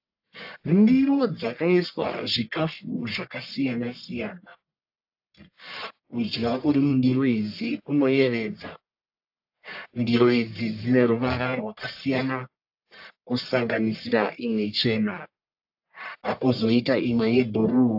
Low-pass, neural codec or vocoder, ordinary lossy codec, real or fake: 5.4 kHz; codec, 44.1 kHz, 1.7 kbps, Pupu-Codec; AAC, 48 kbps; fake